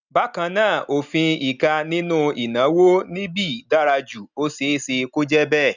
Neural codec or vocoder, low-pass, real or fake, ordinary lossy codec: none; 7.2 kHz; real; none